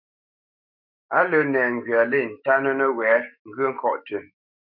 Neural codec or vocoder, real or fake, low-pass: autoencoder, 48 kHz, 128 numbers a frame, DAC-VAE, trained on Japanese speech; fake; 5.4 kHz